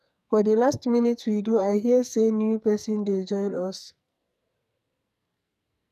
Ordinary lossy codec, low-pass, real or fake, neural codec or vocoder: none; 14.4 kHz; fake; codec, 44.1 kHz, 2.6 kbps, SNAC